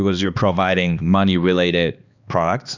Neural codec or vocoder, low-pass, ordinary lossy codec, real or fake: codec, 16 kHz, 2 kbps, X-Codec, HuBERT features, trained on balanced general audio; 7.2 kHz; Opus, 64 kbps; fake